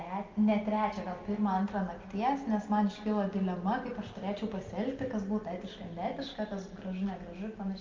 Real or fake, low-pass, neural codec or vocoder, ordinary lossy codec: real; 7.2 kHz; none; Opus, 32 kbps